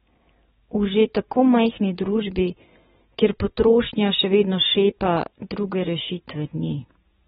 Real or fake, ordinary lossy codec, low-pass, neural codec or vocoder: real; AAC, 16 kbps; 19.8 kHz; none